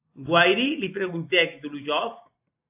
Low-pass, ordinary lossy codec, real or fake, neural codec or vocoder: 3.6 kHz; AAC, 32 kbps; fake; vocoder, 44.1 kHz, 128 mel bands every 256 samples, BigVGAN v2